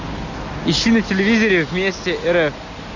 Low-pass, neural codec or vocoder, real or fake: 7.2 kHz; none; real